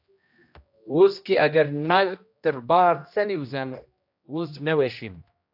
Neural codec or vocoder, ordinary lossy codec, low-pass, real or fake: codec, 16 kHz, 1 kbps, X-Codec, HuBERT features, trained on general audio; AAC, 48 kbps; 5.4 kHz; fake